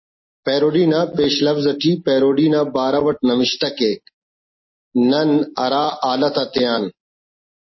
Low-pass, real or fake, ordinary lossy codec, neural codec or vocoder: 7.2 kHz; real; MP3, 24 kbps; none